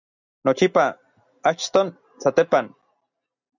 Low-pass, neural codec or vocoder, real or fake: 7.2 kHz; none; real